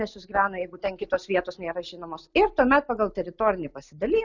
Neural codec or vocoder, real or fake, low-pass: none; real; 7.2 kHz